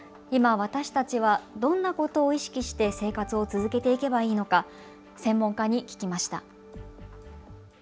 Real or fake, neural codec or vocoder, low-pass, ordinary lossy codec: real; none; none; none